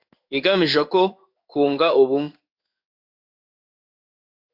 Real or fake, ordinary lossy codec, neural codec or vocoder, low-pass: fake; AAC, 48 kbps; codec, 16 kHz in and 24 kHz out, 1 kbps, XY-Tokenizer; 5.4 kHz